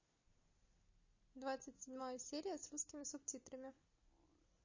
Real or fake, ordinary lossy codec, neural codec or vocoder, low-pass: real; MP3, 32 kbps; none; 7.2 kHz